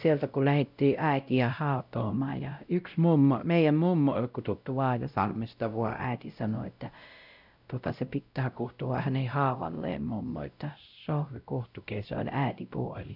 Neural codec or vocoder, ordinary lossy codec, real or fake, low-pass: codec, 16 kHz, 0.5 kbps, X-Codec, WavLM features, trained on Multilingual LibriSpeech; none; fake; 5.4 kHz